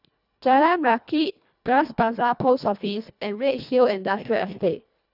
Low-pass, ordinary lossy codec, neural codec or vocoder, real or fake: 5.4 kHz; none; codec, 24 kHz, 1.5 kbps, HILCodec; fake